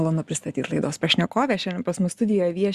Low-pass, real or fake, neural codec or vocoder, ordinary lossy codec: 14.4 kHz; real; none; Opus, 64 kbps